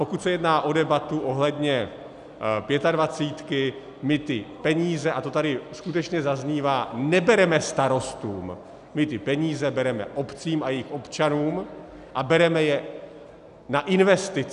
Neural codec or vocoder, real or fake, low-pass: none; real; 10.8 kHz